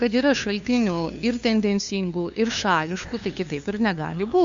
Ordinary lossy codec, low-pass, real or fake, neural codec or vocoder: Opus, 64 kbps; 7.2 kHz; fake; codec, 16 kHz, 2 kbps, FunCodec, trained on LibriTTS, 25 frames a second